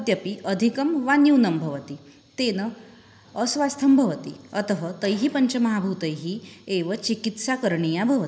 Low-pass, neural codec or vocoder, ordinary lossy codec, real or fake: none; none; none; real